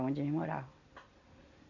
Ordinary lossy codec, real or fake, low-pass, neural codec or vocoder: none; real; 7.2 kHz; none